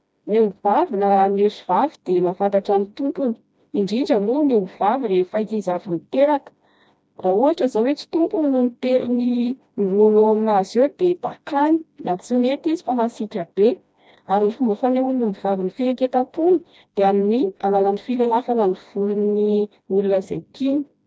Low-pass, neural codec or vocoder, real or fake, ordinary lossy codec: none; codec, 16 kHz, 1 kbps, FreqCodec, smaller model; fake; none